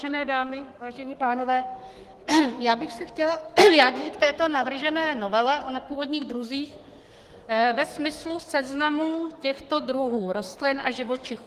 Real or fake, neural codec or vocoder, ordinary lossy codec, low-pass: fake; codec, 32 kHz, 1.9 kbps, SNAC; Opus, 24 kbps; 14.4 kHz